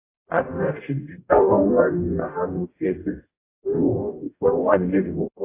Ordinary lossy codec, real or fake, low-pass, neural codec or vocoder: AAC, 24 kbps; fake; 3.6 kHz; codec, 44.1 kHz, 0.9 kbps, DAC